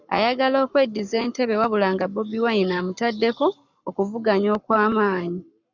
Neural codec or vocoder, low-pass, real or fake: codec, 44.1 kHz, 7.8 kbps, Pupu-Codec; 7.2 kHz; fake